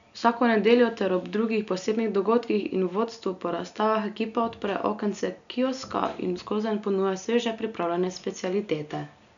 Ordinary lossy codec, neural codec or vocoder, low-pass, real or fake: none; none; 7.2 kHz; real